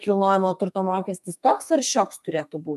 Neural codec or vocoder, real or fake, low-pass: codec, 32 kHz, 1.9 kbps, SNAC; fake; 14.4 kHz